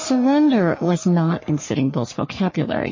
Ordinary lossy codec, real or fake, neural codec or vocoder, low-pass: MP3, 32 kbps; fake; codec, 44.1 kHz, 3.4 kbps, Pupu-Codec; 7.2 kHz